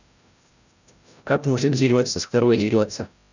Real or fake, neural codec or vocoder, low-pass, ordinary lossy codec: fake; codec, 16 kHz, 0.5 kbps, FreqCodec, larger model; 7.2 kHz; none